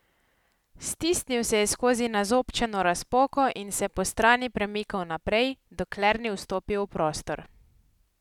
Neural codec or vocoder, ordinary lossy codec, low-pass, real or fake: none; none; 19.8 kHz; real